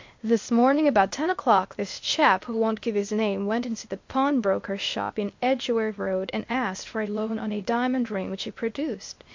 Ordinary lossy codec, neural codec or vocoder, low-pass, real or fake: MP3, 48 kbps; codec, 16 kHz, 0.8 kbps, ZipCodec; 7.2 kHz; fake